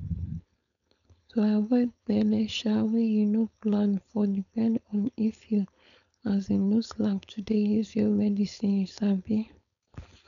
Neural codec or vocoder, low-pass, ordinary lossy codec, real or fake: codec, 16 kHz, 4.8 kbps, FACodec; 7.2 kHz; none; fake